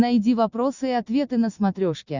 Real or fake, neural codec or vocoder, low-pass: real; none; 7.2 kHz